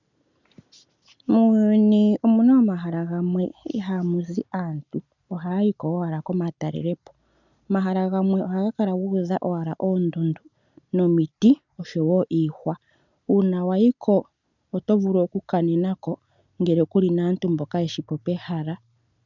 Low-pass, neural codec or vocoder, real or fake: 7.2 kHz; none; real